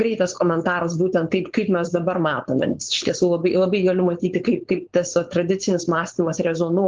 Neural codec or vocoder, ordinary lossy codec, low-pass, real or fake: codec, 16 kHz, 4.8 kbps, FACodec; Opus, 16 kbps; 7.2 kHz; fake